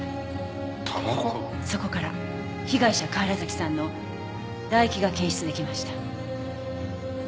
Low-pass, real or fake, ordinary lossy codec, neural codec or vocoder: none; real; none; none